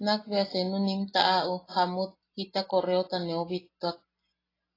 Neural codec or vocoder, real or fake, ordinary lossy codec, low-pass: none; real; AAC, 24 kbps; 5.4 kHz